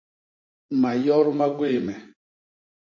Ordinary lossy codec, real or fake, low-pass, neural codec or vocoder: MP3, 32 kbps; fake; 7.2 kHz; vocoder, 44.1 kHz, 80 mel bands, Vocos